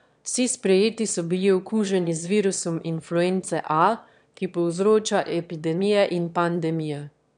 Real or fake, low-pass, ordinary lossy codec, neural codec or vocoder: fake; 9.9 kHz; none; autoencoder, 22.05 kHz, a latent of 192 numbers a frame, VITS, trained on one speaker